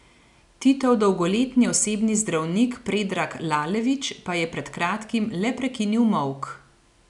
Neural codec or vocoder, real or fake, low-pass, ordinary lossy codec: none; real; 10.8 kHz; none